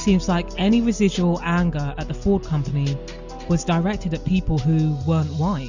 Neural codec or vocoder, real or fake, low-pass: none; real; 7.2 kHz